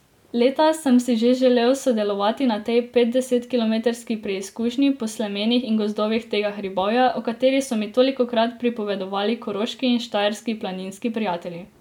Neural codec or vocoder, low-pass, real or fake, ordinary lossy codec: none; 19.8 kHz; real; none